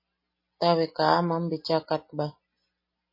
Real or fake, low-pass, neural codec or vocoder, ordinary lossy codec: real; 5.4 kHz; none; MP3, 24 kbps